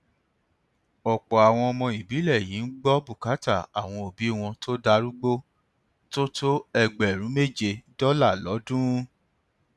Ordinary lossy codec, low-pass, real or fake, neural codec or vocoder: none; none; real; none